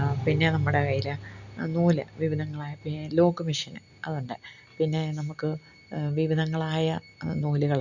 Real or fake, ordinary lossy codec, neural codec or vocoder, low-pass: real; none; none; 7.2 kHz